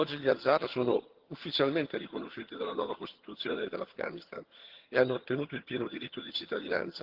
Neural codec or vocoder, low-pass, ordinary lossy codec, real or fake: vocoder, 22.05 kHz, 80 mel bands, HiFi-GAN; 5.4 kHz; Opus, 16 kbps; fake